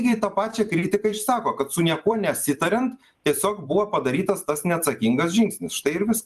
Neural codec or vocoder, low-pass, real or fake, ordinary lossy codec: vocoder, 44.1 kHz, 128 mel bands every 256 samples, BigVGAN v2; 14.4 kHz; fake; Opus, 32 kbps